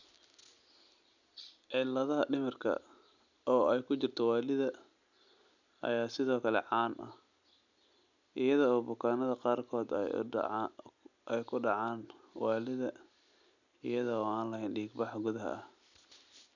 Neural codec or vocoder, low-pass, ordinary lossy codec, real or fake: none; 7.2 kHz; none; real